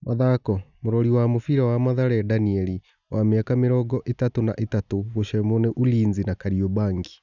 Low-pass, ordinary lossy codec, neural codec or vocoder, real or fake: 7.2 kHz; none; none; real